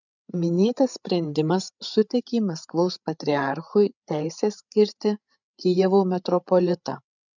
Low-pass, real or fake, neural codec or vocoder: 7.2 kHz; fake; codec, 16 kHz, 8 kbps, FreqCodec, larger model